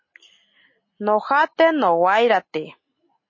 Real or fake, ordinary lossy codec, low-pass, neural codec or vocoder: real; MP3, 32 kbps; 7.2 kHz; none